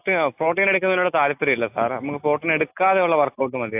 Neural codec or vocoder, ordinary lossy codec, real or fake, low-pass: none; AAC, 32 kbps; real; 3.6 kHz